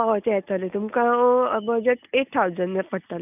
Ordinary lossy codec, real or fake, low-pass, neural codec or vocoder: none; real; 3.6 kHz; none